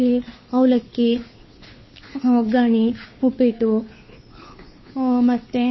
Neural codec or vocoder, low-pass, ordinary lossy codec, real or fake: codec, 16 kHz, 2 kbps, FunCodec, trained on Chinese and English, 25 frames a second; 7.2 kHz; MP3, 24 kbps; fake